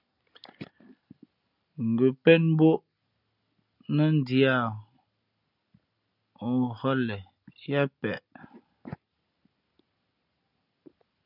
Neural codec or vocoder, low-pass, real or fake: none; 5.4 kHz; real